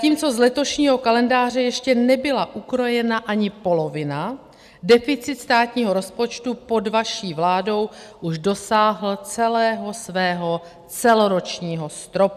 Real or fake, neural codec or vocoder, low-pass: real; none; 14.4 kHz